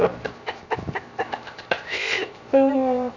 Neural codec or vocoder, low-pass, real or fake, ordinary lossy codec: codec, 16 kHz, 0.7 kbps, FocalCodec; 7.2 kHz; fake; none